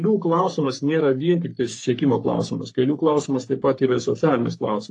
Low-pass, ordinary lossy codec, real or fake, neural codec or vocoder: 10.8 kHz; AAC, 48 kbps; fake; codec, 44.1 kHz, 3.4 kbps, Pupu-Codec